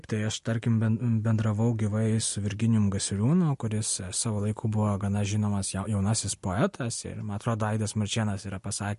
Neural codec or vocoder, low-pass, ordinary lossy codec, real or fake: none; 14.4 kHz; MP3, 48 kbps; real